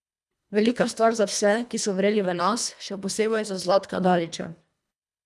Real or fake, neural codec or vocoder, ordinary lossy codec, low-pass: fake; codec, 24 kHz, 1.5 kbps, HILCodec; none; none